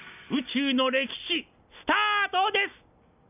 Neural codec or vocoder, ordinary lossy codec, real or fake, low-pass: none; none; real; 3.6 kHz